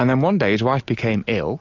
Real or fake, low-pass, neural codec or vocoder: real; 7.2 kHz; none